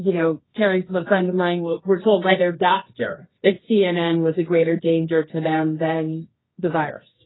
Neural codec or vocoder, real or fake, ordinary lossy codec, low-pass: codec, 24 kHz, 0.9 kbps, WavTokenizer, medium music audio release; fake; AAC, 16 kbps; 7.2 kHz